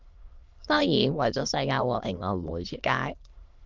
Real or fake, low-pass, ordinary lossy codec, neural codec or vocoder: fake; 7.2 kHz; Opus, 32 kbps; autoencoder, 22.05 kHz, a latent of 192 numbers a frame, VITS, trained on many speakers